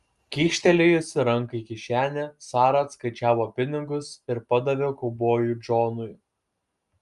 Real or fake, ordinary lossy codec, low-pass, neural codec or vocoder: real; Opus, 32 kbps; 10.8 kHz; none